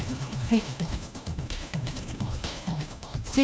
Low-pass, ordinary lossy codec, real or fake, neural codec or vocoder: none; none; fake; codec, 16 kHz, 1 kbps, FunCodec, trained on LibriTTS, 50 frames a second